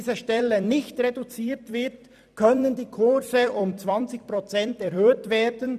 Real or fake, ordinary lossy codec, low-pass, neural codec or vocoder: fake; none; 14.4 kHz; vocoder, 44.1 kHz, 128 mel bands every 256 samples, BigVGAN v2